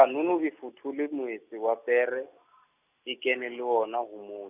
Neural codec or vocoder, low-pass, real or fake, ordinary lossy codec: none; 3.6 kHz; real; none